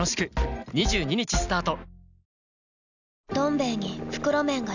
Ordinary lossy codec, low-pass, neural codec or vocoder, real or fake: none; 7.2 kHz; none; real